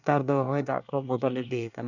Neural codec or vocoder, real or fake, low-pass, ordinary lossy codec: codec, 24 kHz, 1 kbps, SNAC; fake; 7.2 kHz; none